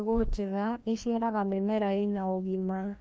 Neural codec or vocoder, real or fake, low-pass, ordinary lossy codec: codec, 16 kHz, 1 kbps, FreqCodec, larger model; fake; none; none